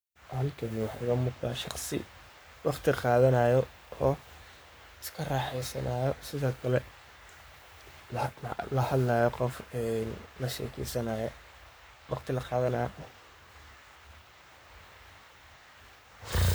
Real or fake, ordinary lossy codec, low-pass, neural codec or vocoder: fake; none; none; codec, 44.1 kHz, 7.8 kbps, Pupu-Codec